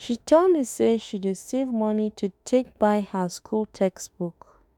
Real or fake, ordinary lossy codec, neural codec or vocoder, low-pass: fake; none; autoencoder, 48 kHz, 32 numbers a frame, DAC-VAE, trained on Japanese speech; 19.8 kHz